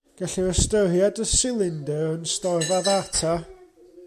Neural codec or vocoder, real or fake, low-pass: none; real; 14.4 kHz